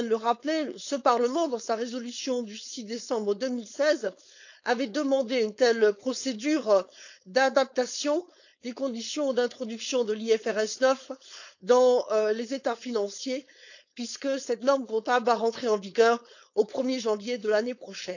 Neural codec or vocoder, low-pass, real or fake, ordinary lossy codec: codec, 16 kHz, 4.8 kbps, FACodec; 7.2 kHz; fake; none